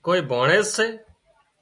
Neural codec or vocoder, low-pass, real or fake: none; 10.8 kHz; real